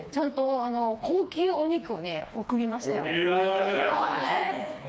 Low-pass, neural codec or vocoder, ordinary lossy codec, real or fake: none; codec, 16 kHz, 2 kbps, FreqCodec, smaller model; none; fake